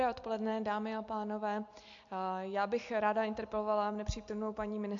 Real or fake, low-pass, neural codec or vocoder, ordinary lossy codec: real; 7.2 kHz; none; MP3, 48 kbps